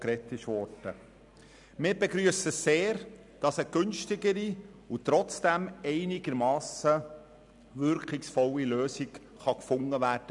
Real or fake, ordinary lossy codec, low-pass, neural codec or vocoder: real; none; 10.8 kHz; none